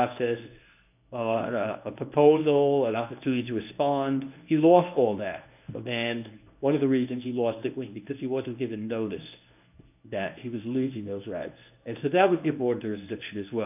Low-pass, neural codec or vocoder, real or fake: 3.6 kHz; codec, 24 kHz, 0.9 kbps, WavTokenizer, medium speech release version 1; fake